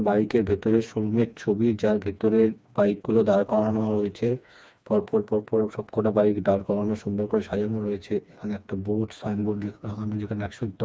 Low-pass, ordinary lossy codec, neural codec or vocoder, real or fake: none; none; codec, 16 kHz, 2 kbps, FreqCodec, smaller model; fake